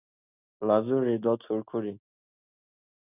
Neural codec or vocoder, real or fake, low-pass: none; real; 3.6 kHz